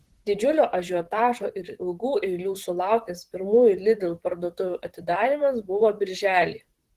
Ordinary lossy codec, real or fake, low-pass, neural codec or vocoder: Opus, 16 kbps; fake; 14.4 kHz; vocoder, 44.1 kHz, 128 mel bands, Pupu-Vocoder